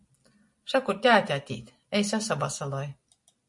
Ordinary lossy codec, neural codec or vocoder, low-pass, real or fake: MP3, 48 kbps; none; 10.8 kHz; real